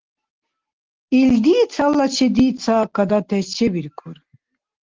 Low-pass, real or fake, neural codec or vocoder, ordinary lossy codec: 7.2 kHz; real; none; Opus, 16 kbps